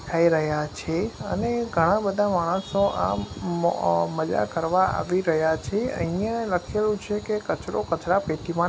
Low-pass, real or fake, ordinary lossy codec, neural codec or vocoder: none; real; none; none